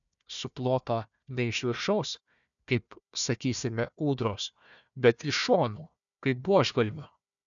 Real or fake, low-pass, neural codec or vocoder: fake; 7.2 kHz; codec, 16 kHz, 1 kbps, FunCodec, trained on Chinese and English, 50 frames a second